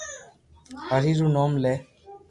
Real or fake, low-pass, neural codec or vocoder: real; 10.8 kHz; none